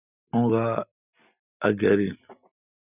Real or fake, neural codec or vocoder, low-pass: real; none; 3.6 kHz